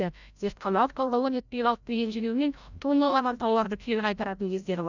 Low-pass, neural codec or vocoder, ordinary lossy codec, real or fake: 7.2 kHz; codec, 16 kHz, 0.5 kbps, FreqCodec, larger model; none; fake